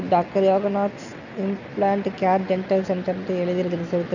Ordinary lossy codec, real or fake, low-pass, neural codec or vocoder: none; real; 7.2 kHz; none